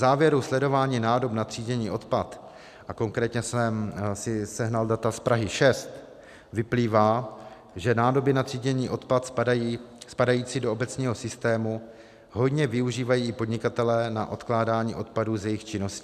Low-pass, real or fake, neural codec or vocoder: 14.4 kHz; real; none